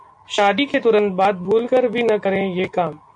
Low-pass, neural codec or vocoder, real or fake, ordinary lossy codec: 9.9 kHz; none; real; MP3, 64 kbps